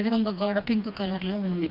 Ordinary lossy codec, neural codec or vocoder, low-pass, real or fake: none; codec, 16 kHz, 2 kbps, FreqCodec, smaller model; 5.4 kHz; fake